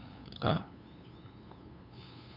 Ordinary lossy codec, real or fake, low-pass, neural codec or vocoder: none; fake; 5.4 kHz; codec, 16 kHz, 2 kbps, FunCodec, trained on LibriTTS, 25 frames a second